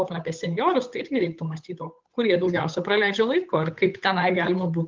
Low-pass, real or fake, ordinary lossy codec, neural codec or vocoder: 7.2 kHz; fake; Opus, 24 kbps; codec, 16 kHz, 8 kbps, FunCodec, trained on Chinese and English, 25 frames a second